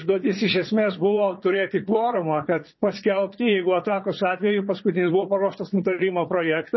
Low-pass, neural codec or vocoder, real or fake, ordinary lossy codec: 7.2 kHz; vocoder, 22.05 kHz, 80 mel bands, Vocos; fake; MP3, 24 kbps